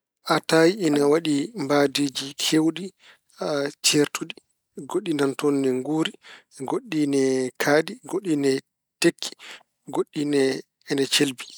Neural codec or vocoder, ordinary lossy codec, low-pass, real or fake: none; none; none; real